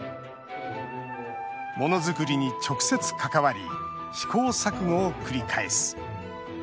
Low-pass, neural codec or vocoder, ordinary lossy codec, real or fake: none; none; none; real